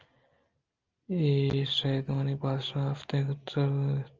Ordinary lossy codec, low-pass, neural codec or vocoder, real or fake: Opus, 24 kbps; 7.2 kHz; none; real